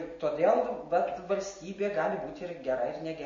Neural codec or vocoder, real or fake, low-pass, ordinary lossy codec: none; real; 7.2 kHz; MP3, 32 kbps